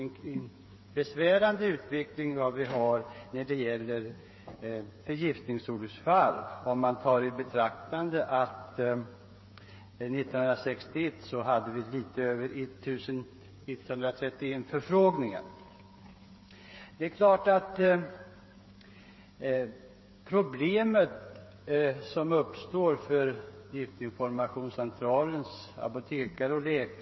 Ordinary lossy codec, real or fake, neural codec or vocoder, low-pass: MP3, 24 kbps; fake; codec, 16 kHz, 8 kbps, FreqCodec, smaller model; 7.2 kHz